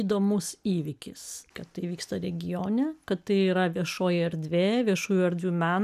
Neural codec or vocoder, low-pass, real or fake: none; 14.4 kHz; real